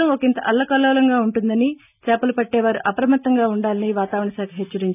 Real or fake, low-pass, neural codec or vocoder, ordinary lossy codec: real; 3.6 kHz; none; none